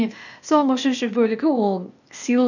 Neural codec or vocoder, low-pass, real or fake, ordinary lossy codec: codec, 16 kHz, 0.8 kbps, ZipCodec; 7.2 kHz; fake; none